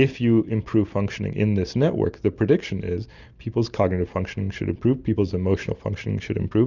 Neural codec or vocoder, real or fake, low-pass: none; real; 7.2 kHz